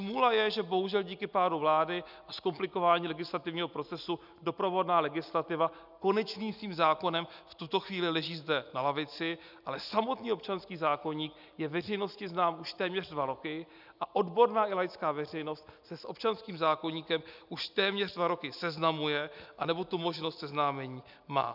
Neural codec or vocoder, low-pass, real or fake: none; 5.4 kHz; real